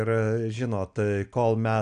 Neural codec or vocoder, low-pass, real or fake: none; 9.9 kHz; real